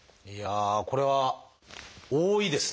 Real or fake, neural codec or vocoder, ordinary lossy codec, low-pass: real; none; none; none